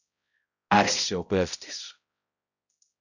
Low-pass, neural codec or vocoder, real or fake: 7.2 kHz; codec, 16 kHz, 0.5 kbps, X-Codec, HuBERT features, trained on balanced general audio; fake